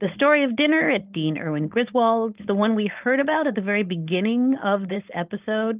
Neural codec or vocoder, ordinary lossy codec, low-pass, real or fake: codec, 16 kHz, 4.8 kbps, FACodec; Opus, 32 kbps; 3.6 kHz; fake